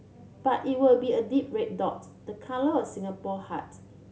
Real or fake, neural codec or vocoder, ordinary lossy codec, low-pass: real; none; none; none